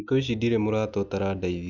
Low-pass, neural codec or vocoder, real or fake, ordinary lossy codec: 7.2 kHz; none; real; none